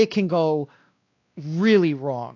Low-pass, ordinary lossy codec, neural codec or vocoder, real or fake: 7.2 kHz; AAC, 48 kbps; codec, 16 kHz, 2 kbps, X-Codec, WavLM features, trained on Multilingual LibriSpeech; fake